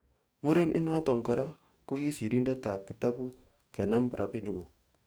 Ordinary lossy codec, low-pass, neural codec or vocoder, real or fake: none; none; codec, 44.1 kHz, 2.6 kbps, DAC; fake